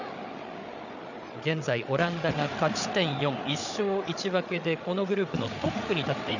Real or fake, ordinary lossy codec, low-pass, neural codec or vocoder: fake; none; 7.2 kHz; codec, 16 kHz, 8 kbps, FreqCodec, larger model